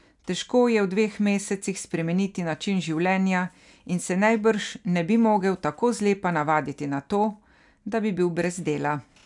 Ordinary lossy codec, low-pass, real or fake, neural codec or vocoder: none; 10.8 kHz; real; none